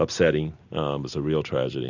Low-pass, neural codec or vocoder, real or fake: 7.2 kHz; none; real